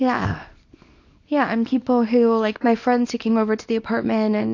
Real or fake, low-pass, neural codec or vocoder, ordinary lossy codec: fake; 7.2 kHz; codec, 24 kHz, 0.9 kbps, WavTokenizer, small release; AAC, 48 kbps